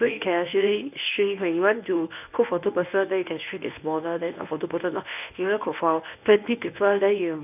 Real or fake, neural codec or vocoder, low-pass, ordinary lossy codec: fake; codec, 24 kHz, 0.9 kbps, WavTokenizer, medium speech release version 1; 3.6 kHz; none